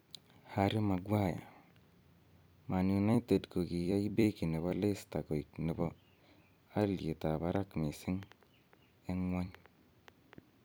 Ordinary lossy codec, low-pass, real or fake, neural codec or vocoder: none; none; fake; vocoder, 44.1 kHz, 128 mel bands every 256 samples, BigVGAN v2